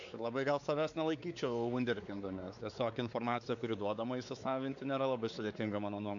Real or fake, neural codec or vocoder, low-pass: fake; codec, 16 kHz, 4 kbps, X-Codec, WavLM features, trained on Multilingual LibriSpeech; 7.2 kHz